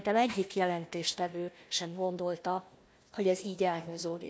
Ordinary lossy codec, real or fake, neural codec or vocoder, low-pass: none; fake; codec, 16 kHz, 1 kbps, FunCodec, trained on Chinese and English, 50 frames a second; none